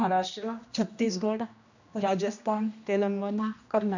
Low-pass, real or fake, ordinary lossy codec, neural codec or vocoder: 7.2 kHz; fake; none; codec, 16 kHz, 1 kbps, X-Codec, HuBERT features, trained on general audio